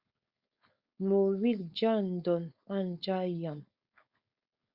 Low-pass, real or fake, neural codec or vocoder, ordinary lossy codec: 5.4 kHz; fake; codec, 16 kHz, 4.8 kbps, FACodec; Opus, 64 kbps